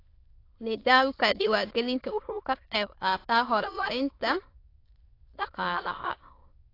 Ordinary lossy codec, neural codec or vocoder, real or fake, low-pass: AAC, 32 kbps; autoencoder, 22.05 kHz, a latent of 192 numbers a frame, VITS, trained on many speakers; fake; 5.4 kHz